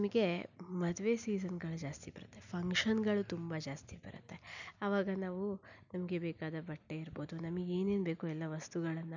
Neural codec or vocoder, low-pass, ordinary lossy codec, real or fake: none; 7.2 kHz; none; real